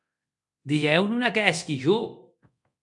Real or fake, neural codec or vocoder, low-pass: fake; codec, 24 kHz, 0.9 kbps, DualCodec; 10.8 kHz